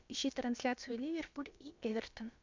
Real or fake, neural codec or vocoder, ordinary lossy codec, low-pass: fake; codec, 16 kHz, about 1 kbps, DyCAST, with the encoder's durations; MP3, 64 kbps; 7.2 kHz